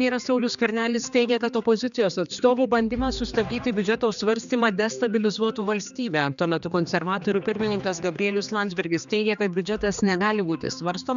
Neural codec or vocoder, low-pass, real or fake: codec, 16 kHz, 2 kbps, X-Codec, HuBERT features, trained on general audio; 7.2 kHz; fake